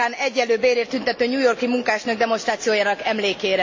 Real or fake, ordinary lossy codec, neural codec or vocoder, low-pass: real; MP3, 32 kbps; none; 7.2 kHz